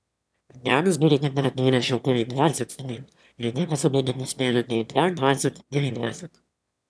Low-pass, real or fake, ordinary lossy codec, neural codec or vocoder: none; fake; none; autoencoder, 22.05 kHz, a latent of 192 numbers a frame, VITS, trained on one speaker